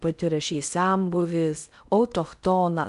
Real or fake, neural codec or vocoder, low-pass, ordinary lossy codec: fake; codec, 16 kHz in and 24 kHz out, 0.6 kbps, FocalCodec, streaming, 4096 codes; 10.8 kHz; MP3, 96 kbps